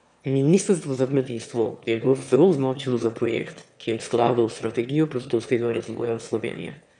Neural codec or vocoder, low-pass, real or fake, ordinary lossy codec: autoencoder, 22.05 kHz, a latent of 192 numbers a frame, VITS, trained on one speaker; 9.9 kHz; fake; none